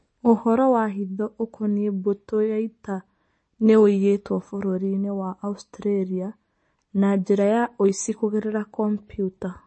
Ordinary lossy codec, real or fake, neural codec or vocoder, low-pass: MP3, 32 kbps; real; none; 9.9 kHz